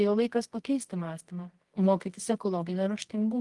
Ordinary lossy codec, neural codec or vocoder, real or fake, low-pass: Opus, 24 kbps; codec, 24 kHz, 0.9 kbps, WavTokenizer, medium music audio release; fake; 10.8 kHz